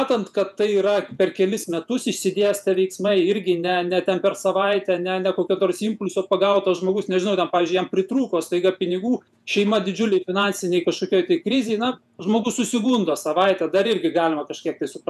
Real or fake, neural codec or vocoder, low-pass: fake; vocoder, 48 kHz, 128 mel bands, Vocos; 14.4 kHz